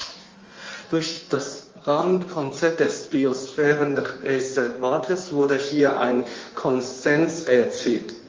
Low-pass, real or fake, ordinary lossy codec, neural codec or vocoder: 7.2 kHz; fake; Opus, 32 kbps; codec, 16 kHz in and 24 kHz out, 1.1 kbps, FireRedTTS-2 codec